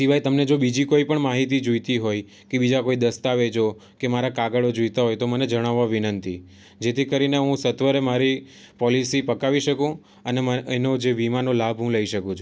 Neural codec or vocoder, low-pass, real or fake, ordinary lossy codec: none; none; real; none